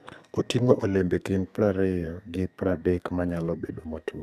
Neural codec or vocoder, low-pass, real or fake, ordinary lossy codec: codec, 32 kHz, 1.9 kbps, SNAC; 14.4 kHz; fake; none